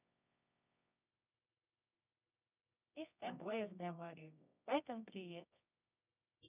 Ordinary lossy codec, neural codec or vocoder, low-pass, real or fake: none; codec, 24 kHz, 0.9 kbps, WavTokenizer, medium music audio release; 3.6 kHz; fake